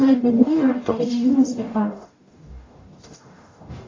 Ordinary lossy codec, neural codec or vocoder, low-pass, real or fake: AAC, 32 kbps; codec, 44.1 kHz, 0.9 kbps, DAC; 7.2 kHz; fake